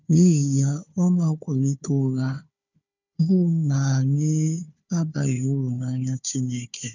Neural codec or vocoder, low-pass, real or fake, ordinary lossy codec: codec, 16 kHz, 4 kbps, FunCodec, trained on Chinese and English, 50 frames a second; 7.2 kHz; fake; none